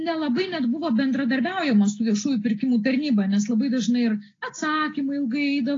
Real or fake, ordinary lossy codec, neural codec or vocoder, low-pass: real; AAC, 32 kbps; none; 7.2 kHz